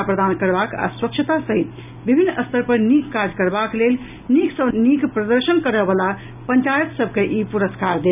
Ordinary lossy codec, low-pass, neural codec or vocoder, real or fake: none; 3.6 kHz; none; real